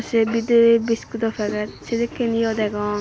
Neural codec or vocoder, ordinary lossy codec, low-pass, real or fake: none; none; none; real